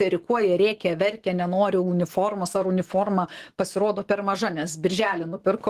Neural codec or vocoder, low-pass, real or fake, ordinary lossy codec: autoencoder, 48 kHz, 128 numbers a frame, DAC-VAE, trained on Japanese speech; 14.4 kHz; fake; Opus, 16 kbps